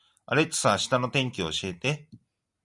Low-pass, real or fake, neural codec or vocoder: 10.8 kHz; real; none